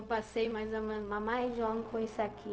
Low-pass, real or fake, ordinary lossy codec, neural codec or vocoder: none; fake; none; codec, 16 kHz, 0.4 kbps, LongCat-Audio-Codec